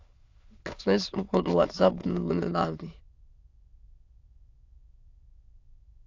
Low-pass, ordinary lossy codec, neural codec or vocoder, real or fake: 7.2 kHz; AAC, 48 kbps; autoencoder, 22.05 kHz, a latent of 192 numbers a frame, VITS, trained on many speakers; fake